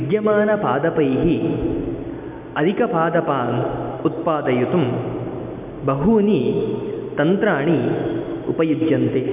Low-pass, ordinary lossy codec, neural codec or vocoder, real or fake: 3.6 kHz; none; none; real